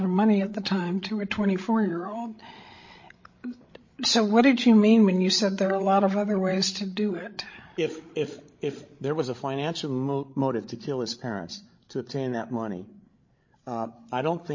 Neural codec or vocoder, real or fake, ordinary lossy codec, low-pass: codec, 16 kHz, 16 kbps, FreqCodec, larger model; fake; MP3, 32 kbps; 7.2 kHz